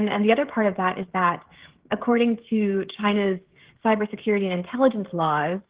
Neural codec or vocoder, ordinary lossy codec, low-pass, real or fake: codec, 16 kHz, 16 kbps, FreqCodec, smaller model; Opus, 16 kbps; 3.6 kHz; fake